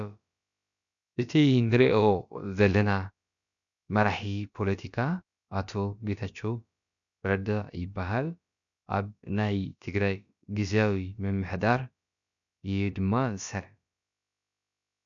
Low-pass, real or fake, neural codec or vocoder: 7.2 kHz; fake; codec, 16 kHz, about 1 kbps, DyCAST, with the encoder's durations